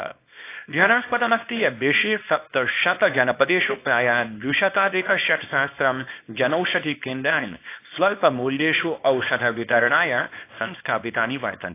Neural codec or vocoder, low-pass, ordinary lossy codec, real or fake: codec, 24 kHz, 0.9 kbps, WavTokenizer, small release; 3.6 kHz; AAC, 24 kbps; fake